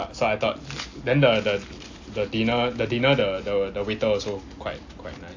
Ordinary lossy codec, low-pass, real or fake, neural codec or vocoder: AAC, 48 kbps; 7.2 kHz; real; none